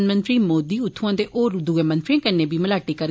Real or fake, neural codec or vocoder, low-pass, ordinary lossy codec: real; none; none; none